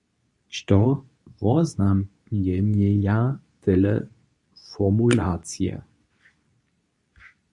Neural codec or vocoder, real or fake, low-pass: codec, 24 kHz, 0.9 kbps, WavTokenizer, medium speech release version 2; fake; 10.8 kHz